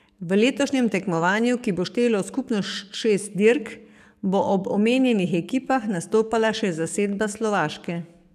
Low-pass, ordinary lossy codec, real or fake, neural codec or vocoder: 14.4 kHz; none; fake; codec, 44.1 kHz, 7.8 kbps, DAC